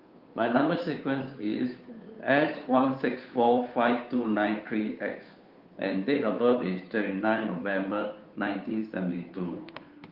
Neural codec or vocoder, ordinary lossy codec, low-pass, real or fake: codec, 16 kHz, 2 kbps, FunCodec, trained on Chinese and English, 25 frames a second; Opus, 32 kbps; 5.4 kHz; fake